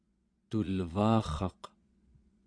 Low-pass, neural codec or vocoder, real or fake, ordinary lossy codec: 9.9 kHz; vocoder, 22.05 kHz, 80 mel bands, Vocos; fake; AAC, 64 kbps